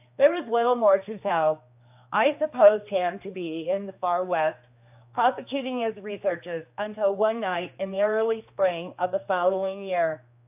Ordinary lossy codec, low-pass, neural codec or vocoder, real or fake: AAC, 32 kbps; 3.6 kHz; codec, 16 kHz, 2 kbps, X-Codec, HuBERT features, trained on general audio; fake